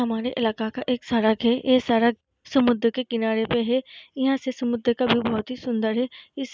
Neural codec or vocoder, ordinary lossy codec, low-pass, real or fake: none; none; none; real